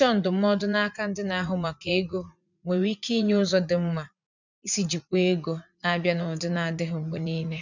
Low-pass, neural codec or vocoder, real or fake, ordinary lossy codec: 7.2 kHz; vocoder, 44.1 kHz, 80 mel bands, Vocos; fake; none